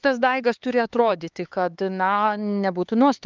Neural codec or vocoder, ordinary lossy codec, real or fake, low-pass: codec, 16 kHz, 4 kbps, X-Codec, HuBERT features, trained on LibriSpeech; Opus, 32 kbps; fake; 7.2 kHz